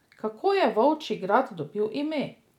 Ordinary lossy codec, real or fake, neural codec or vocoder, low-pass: none; real; none; 19.8 kHz